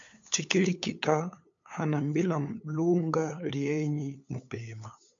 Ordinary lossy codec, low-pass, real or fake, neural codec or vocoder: MP3, 64 kbps; 7.2 kHz; fake; codec, 16 kHz, 8 kbps, FunCodec, trained on LibriTTS, 25 frames a second